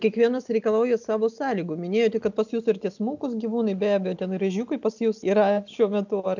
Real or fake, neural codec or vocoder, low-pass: real; none; 7.2 kHz